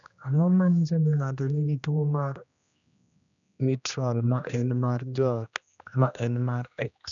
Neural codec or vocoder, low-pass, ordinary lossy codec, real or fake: codec, 16 kHz, 1 kbps, X-Codec, HuBERT features, trained on general audio; 7.2 kHz; none; fake